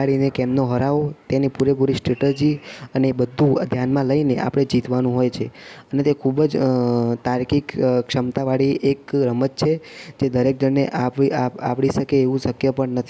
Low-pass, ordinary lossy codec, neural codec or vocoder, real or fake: none; none; none; real